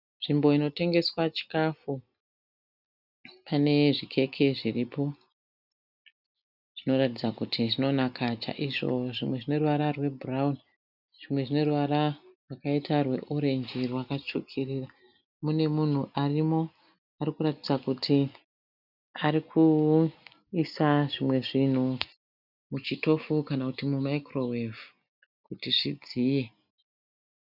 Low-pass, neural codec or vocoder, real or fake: 5.4 kHz; none; real